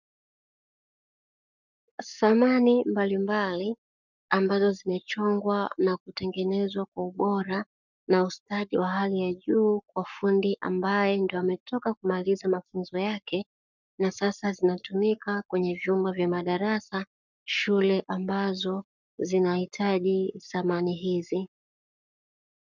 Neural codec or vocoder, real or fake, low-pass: codec, 44.1 kHz, 7.8 kbps, Pupu-Codec; fake; 7.2 kHz